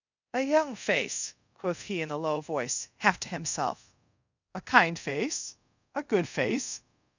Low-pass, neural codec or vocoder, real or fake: 7.2 kHz; codec, 24 kHz, 0.5 kbps, DualCodec; fake